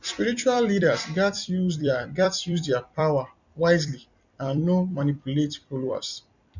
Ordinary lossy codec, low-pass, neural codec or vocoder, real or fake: none; 7.2 kHz; vocoder, 44.1 kHz, 128 mel bands every 256 samples, BigVGAN v2; fake